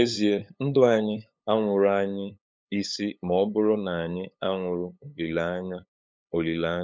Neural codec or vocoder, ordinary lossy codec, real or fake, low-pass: codec, 16 kHz, 8 kbps, FunCodec, trained on LibriTTS, 25 frames a second; none; fake; none